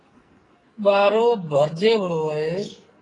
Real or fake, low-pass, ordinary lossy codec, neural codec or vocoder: fake; 10.8 kHz; AAC, 32 kbps; codec, 44.1 kHz, 2.6 kbps, SNAC